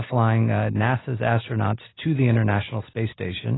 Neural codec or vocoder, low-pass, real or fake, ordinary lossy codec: none; 7.2 kHz; real; AAC, 16 kbps